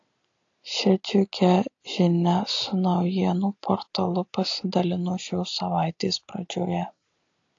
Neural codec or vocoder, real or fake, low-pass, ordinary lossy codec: none; real; 7.2 kHz; AAC, 48 kbps